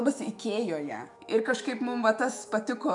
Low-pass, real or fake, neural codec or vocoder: 10.8 kHz; fake; autoencoder, 48 kHz, 128 numbers a frame, DAC-VAE, trained on Japanese speech